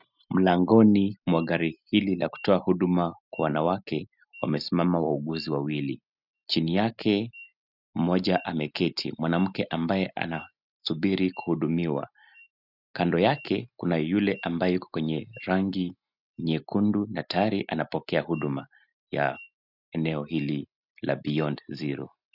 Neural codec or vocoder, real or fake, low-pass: none; real; 5.4 kHz